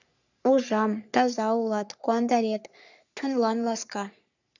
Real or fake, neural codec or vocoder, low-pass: fake; codec, 44.1 kHz, 3.4 kbps, Pupu-Codec; 7.2 kHz